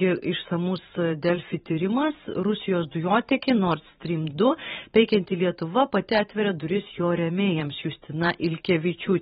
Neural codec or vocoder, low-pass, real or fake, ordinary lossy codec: vocoder, 44.1 kHz, 128 mel bands every 512 samples, BigVGAN v2; 19.8 kHz; fake; AAC, 16 kbps